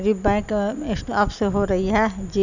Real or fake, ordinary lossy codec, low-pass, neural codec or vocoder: real; none; 7.2 kHz; none